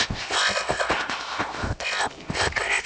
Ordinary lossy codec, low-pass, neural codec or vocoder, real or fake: none; none; codec, 16 kHz, 0.7 kbps, FocalCodec; fake